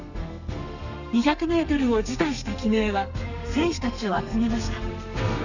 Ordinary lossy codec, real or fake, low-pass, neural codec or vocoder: none; fake; 7.2 kHz; codec, 32 kHz, 1.9 kbps, SNAC